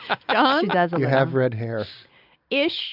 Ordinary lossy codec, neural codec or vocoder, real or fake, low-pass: AAC, 48 kbps; none; real; 5.4 kHz